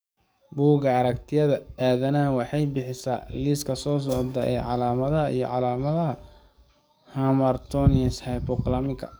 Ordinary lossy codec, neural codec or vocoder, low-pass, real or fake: none; codec, 44.1 kHz, 7.8 kbps, DAC; none; fake